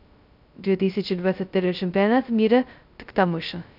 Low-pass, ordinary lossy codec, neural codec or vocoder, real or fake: 5.4 kHz; none; codec, 16 kHz, 0.2 kbps, FocalCodec; fake